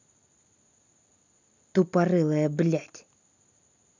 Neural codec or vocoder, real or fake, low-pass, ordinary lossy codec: none; real; 7.2 kHz; none